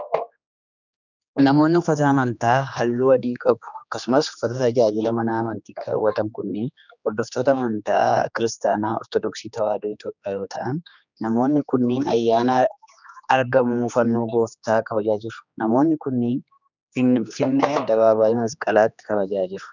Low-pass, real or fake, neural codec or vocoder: 7.2 kHz; fake; codec, 16 kHz, 2 kbps, X-Codec, HuBERT features, trained on general audio